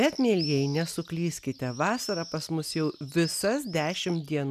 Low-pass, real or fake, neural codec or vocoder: 14.4 kHz; real; none